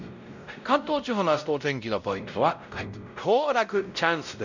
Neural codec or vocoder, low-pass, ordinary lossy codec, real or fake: codec, 16 kHz, 0.5 kbps, X-Codec, WavLM features, trained on Multilingual LibriSpeech; 7.2 kHz; none; fake